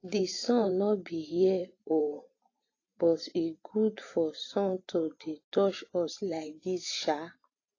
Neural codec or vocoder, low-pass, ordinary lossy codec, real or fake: vocoder, 44.1 kHz, 128 mel bands every 512 samples, BigVGAN v2; 7.2 kHz; AAC, 32 kbps; fake